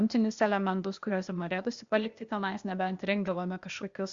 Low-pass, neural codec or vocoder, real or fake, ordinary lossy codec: 7.2 kHz; codec, 16 kHz, 0.8 kbps, ZipCodec; fake; Opus, 64 kbps